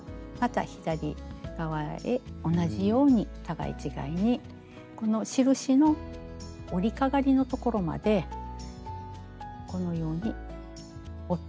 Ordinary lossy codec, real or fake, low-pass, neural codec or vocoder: none; real; none; none